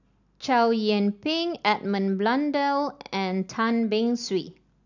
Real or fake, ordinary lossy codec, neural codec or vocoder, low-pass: real; none; none; 7.2 kHz